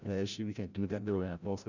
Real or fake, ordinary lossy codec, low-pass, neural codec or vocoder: fake; none; 7.2 kHz; codec, 16 kHz, 0.5 kbps, FreqCodec, larger model